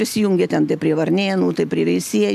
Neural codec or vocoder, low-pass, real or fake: none; 14.4 kHz; real